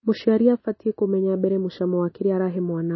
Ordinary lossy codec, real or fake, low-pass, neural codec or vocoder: MP3, 24 kbps; real; 7.2 kHz; none